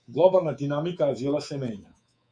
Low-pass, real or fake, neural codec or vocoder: 9.9 kHz; fake; codec, 24 kHz, 3.1 kbps, DualCodec